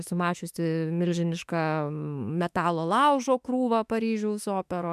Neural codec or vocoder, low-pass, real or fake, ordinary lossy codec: autoencoder, 48 kHz, 32 numbers a frame, DAC-VAE, trained on Japanese speech; 14.4 kHz; fake; MP3, 96 kbps